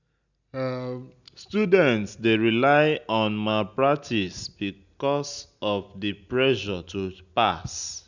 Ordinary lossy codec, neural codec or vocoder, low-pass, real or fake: none; none; 7.2 kHz; real